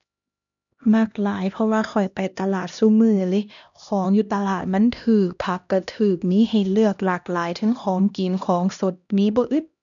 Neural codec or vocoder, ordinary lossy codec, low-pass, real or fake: codec, 16 kHz, 1 kbps, X-Codec, HuBERT features, trained on LibriSpeech; none; 7.2 kHz; fake